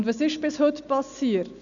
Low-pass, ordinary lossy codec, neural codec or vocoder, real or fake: 7.2 kHz; none; none; real